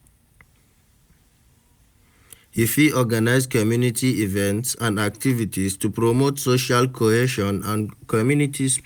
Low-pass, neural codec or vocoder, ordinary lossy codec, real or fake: none; none; none; real